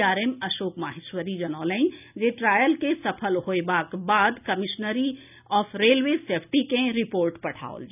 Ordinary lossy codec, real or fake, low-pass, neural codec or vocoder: none; real; 3.6 kHz; none